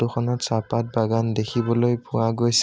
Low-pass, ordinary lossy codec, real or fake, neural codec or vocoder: none; none; real; none